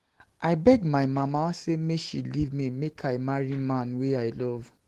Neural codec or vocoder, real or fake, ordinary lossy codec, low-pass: autoencoder, 48 kHz, 128 numbers a frame, DAC-VAE, trained on Japanese speech; fake; Opus, 16 kbps; 14.4 kHz